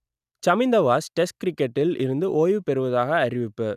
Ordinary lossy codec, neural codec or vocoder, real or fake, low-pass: none; none; real; 14.4 kHz